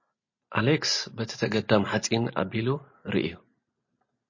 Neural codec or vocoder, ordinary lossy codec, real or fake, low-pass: none; MP3, 32 kbps; real; 7.2 kHz